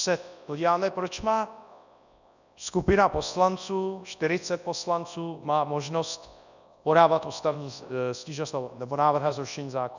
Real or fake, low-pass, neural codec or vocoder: fake; 7.2 kHz; codec, 24 kHz, 0.9 kbps, WavTokenizer, large speech release